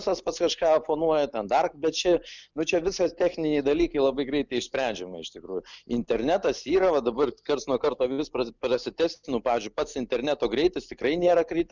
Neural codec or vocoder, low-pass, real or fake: none; 7.2 kHz; real